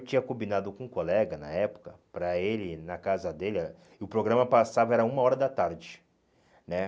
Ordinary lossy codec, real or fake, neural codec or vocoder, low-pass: none; real; none; none